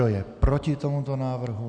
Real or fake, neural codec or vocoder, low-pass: real; none; 9.9 kHz